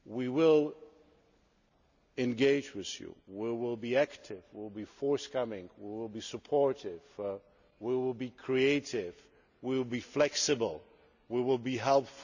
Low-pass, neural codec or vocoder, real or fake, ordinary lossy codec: 7.2 kHz; none; real; none